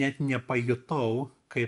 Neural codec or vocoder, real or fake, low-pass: none; real; 10.8 kHz